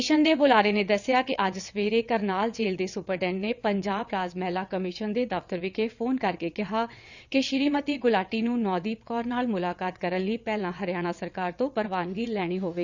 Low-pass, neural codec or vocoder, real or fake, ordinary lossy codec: 7.2 kHz; vocoder, 22.05 kHz, 80 mel bands, WaveNeXt; fake; none